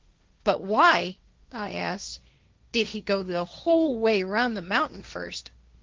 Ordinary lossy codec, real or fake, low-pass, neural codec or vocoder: Opus, 32 kbps; fake; 7.2 kHz; codec, 16 kHz, 1.1 kbps, Voila-Tokenizer